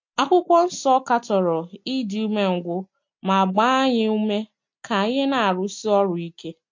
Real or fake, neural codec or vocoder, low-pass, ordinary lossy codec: real; none; 7.2 kHz; MP3, 48 kbps